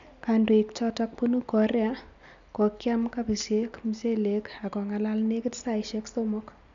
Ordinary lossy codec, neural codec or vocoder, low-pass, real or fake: MP3, 96 kbps; none; 7.2 kHz; real